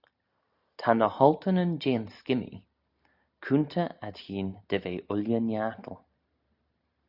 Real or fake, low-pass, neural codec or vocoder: real; 5.4 kHz; none